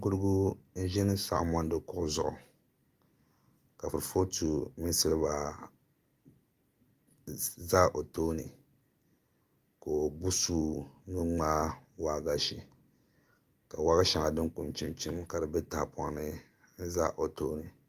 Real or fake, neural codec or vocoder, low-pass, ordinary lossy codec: real; none; 14.4 kHz; Opus, 32 kbps